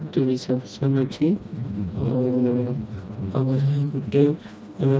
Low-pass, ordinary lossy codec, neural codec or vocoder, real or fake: none; none; codec, 16 kHz, 1 kbps, FreqCodec, smaller model; fake